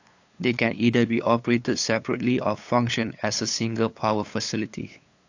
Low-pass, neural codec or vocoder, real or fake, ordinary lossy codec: 7.2 kHz; codec, 16 kHz, 8 kbps, FunCodec, trained on LibriTTS, 25 frames a second; fake; AAC, 48 kbps